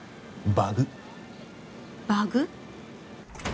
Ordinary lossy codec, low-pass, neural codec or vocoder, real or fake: none; none; none; real